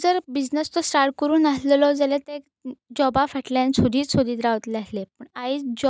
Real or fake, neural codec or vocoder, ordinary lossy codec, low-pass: real; none; none; none